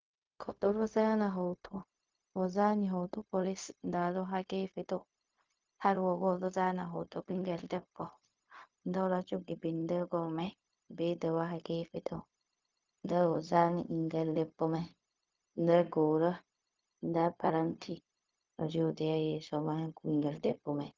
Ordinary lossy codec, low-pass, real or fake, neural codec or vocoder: Opus, 32 kbps; 7.2 kHz; fake; codec, 16 kHz, 0.4 kbps, LongCat-Audio-Codec